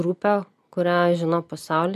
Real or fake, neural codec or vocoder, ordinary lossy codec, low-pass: real; none; MP3, 64 kbps; 14.4 kHz